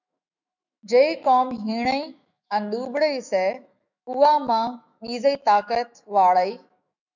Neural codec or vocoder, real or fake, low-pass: autoencoder, 48 kHz, 128 numbers a frame, DAC-VAE, trained on Japanese speech; fake; 7.2 kHz